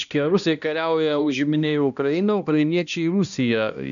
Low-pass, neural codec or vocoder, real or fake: 7.2 kHz; codec, 16 kHz, 1 kbps, X-Codec, HuBERT features, trained on balanced general audio; fake